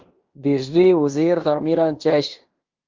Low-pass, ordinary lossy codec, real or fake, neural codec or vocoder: 7.2 kHz; Opus, 32 kbps; fake; codec, 16 kHz in and 24 kHz out, 0.9 kbps, LongCat-Audio-Codec, fine tuned four codebook decoder